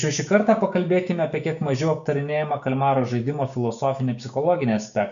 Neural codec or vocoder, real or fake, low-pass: codec, 16 kHz, 6 kbps, DAC; fake; 7.2 kHz